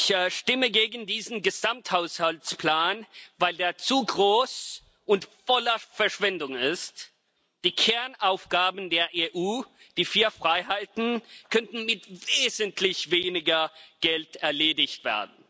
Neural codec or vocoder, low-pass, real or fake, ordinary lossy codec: none; none; real; none